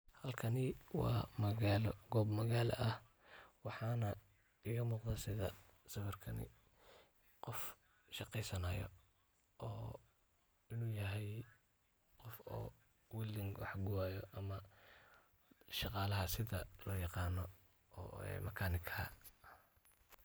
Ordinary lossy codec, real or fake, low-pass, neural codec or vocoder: none; real; none; none